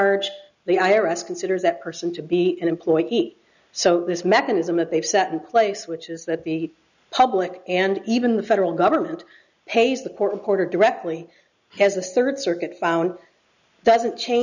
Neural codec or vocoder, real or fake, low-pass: none; real; 7.2 kHz